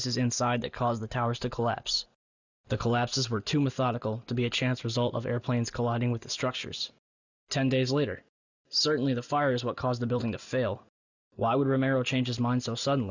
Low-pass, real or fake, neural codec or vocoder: 7.2 kHz; real; none